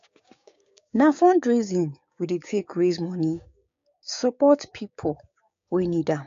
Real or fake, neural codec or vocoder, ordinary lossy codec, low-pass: fake; codec, 16 kHz, 6 kbps, DAC; AAC, 48 kbps; 7.2 kHz